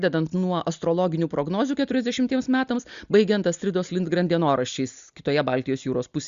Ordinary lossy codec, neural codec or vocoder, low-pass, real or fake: Opus, 64 kbps; none; 7.2 kHz; real